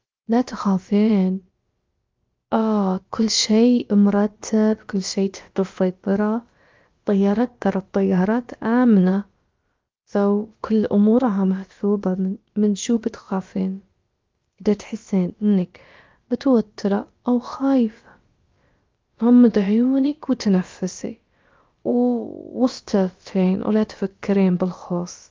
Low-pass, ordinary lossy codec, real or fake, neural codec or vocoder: 7.2 kHz; Opus, 32 kbps; fake; codec, 16 kHz, about 1 kbps, DyCAST, with the encoder's durations